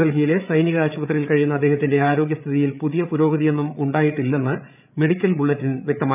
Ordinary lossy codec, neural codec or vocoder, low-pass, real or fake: none; codec, 16 kHz, 8 kbps, FreqCodec, larger model; 3.6 kHz; fake